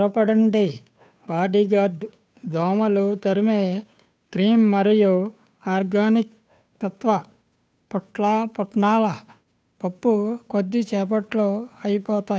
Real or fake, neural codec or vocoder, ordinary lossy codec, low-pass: fake; codec, 16 kHz, 2 kbps, FunCodec, trained on Chinese and English, 25 frames a second; none; none